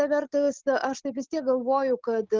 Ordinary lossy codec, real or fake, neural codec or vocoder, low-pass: Opus, 24 kbps; real; none; 7.2 kHz